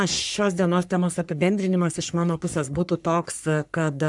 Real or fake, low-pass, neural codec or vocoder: fake; 10.8 kHz; codec, 44.1 kHz, 3.4 kbps, Pupu-Codec